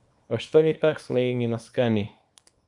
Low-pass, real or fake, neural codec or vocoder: 10.8 kHz; fake; codec, 24 kHz, 0.9 kbps, WavTokenizer, small release